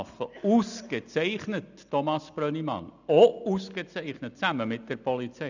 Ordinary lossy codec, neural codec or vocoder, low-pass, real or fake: none; none; 7.2 kHz; real